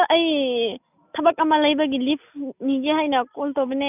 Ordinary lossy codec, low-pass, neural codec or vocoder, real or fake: none; 3.6 kHz; none; real